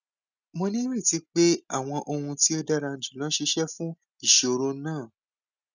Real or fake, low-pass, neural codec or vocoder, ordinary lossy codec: real; 7.2 kHz; none; none